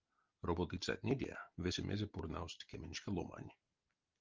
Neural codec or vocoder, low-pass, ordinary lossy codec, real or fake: none; 7.2 kHz; Opus, 32 kbps; real